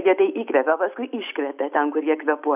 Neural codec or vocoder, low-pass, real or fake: none; 3.6 kHz; real